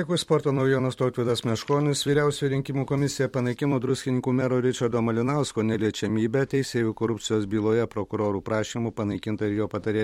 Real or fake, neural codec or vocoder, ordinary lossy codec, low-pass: fake; vocoder, 44.1 kHz, 128 mel bands every 256 samples, BigVGAN v2; MP3, 64 kbps; 19.8 kHz